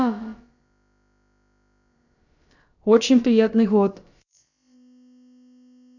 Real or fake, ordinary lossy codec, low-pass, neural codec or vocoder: fake; none; 7.2 kHz; codec, 16 kHz, about 1 kbps, DyCAST, with the encoder's durations